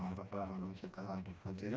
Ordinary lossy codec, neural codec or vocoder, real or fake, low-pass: none; codec, 16 kHz, 1 kbps, FreqCodec, smaller model; fake; none